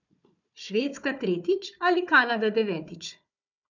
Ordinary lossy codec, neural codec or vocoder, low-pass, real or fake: none; codec, 16 kHz, 4 kbps, FunCodec, trained on Chinese and English, 50 frames a second; 7.2 kHz; fake